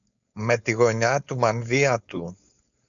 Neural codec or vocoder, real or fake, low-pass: codec, 16 kHz, 4.8 kbps, FACodec; fake; 7.2 kHz